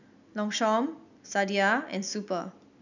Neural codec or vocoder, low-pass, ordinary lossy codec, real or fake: none; 7.2 kHz; none; real